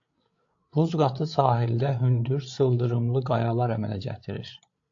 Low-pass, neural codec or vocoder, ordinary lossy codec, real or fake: 7.2 kHz; codec, 16 kHz, 8 kbps, FreqCodec, larger model; AAC, 48 kbps; fake